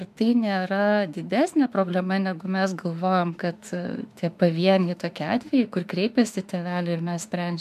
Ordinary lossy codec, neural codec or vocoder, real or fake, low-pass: MP3, 96 kbps; autoencoder, 48 kHz, 32 numbers a frame, DAC-VAE, trained on Japanese speech; fake; 14.4 kHz